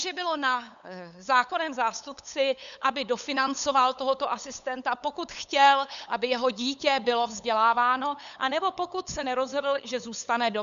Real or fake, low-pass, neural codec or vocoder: fake; 7.2 kHz; codec, 16 kHz, 8 kbps, FunCodec, trained on LibriTTS, 25 frames a second